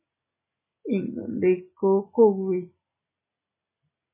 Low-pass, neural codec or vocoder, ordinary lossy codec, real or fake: 3.6 kHz; none; MP3, 16 kbps; real